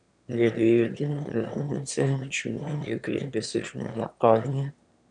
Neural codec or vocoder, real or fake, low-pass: autoencoder, 22.05 kHz, a latent of 192 numbers a frame, VITS, trained on one speaker; fake; 9.9 kHz